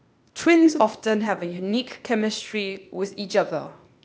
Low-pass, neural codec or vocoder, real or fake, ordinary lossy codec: none; codec, 16 kHz, 0.8 kbps, ZipCodec; fake; none